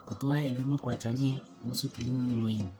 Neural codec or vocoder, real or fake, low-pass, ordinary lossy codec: codec, 44.1 kHz, 1.7 kbps, Pupu-Codec; fake; none; none